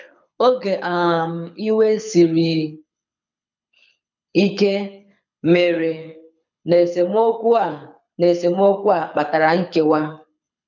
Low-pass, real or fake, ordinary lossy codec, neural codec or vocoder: 7.2 kHz; fake; none; codec, 24 kHz, 6 kbps, HILCodec